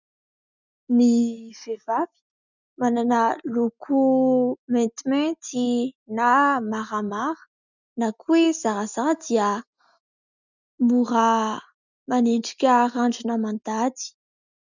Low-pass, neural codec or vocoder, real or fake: 7.2 kHz; none; real